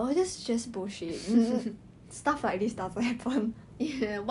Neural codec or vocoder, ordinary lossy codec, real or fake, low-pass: none; none; real; 10.8 kHz